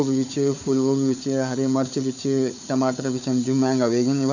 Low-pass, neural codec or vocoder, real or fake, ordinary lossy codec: 7.2 kHz; codec, 16 kHz, 4 kbps, FunCodec, trained on Chinese and English, 50 frames a second; fake; none